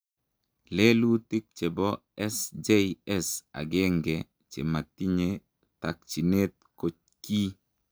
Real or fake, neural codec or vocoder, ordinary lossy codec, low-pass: real; none; none; none